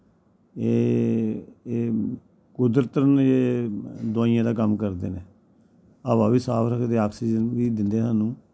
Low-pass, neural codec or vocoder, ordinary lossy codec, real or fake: none; none; none; real